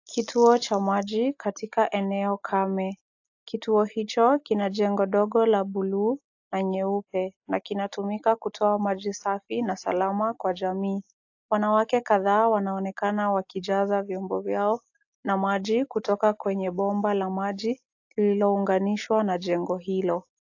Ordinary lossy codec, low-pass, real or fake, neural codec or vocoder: AAC, 48 kbps; 7.2 kHz; real; none